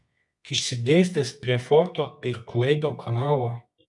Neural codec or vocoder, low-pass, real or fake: codec, 24 kHz, 0.9 kbps, WavTokenizer, medium music audio release; 10.8 kHz; fake